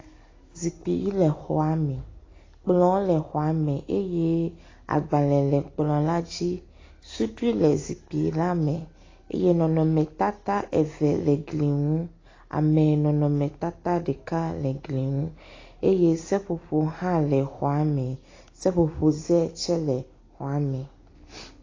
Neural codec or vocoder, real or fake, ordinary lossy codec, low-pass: none; real; AAC, 32 kbps; 7.2 kHz